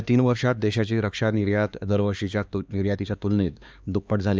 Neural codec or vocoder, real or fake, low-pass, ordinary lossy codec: codec, 16 kHz, 2 kbps, X-Codec, HuBERT features, trained on LibriSpeech; fake; none; none